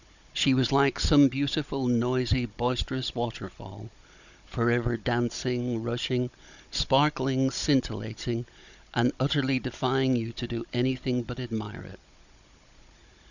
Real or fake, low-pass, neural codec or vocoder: fake; 7.2 kHz; codec, 16 kHz, 16 kbps, FunCodec, trained on Chinese and English, 50 frames a second